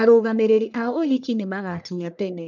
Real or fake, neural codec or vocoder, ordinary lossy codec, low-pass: fake; codec, 44.1 kHz, 1.7 kbps, Pupu-Codec; none; 7.2 kHz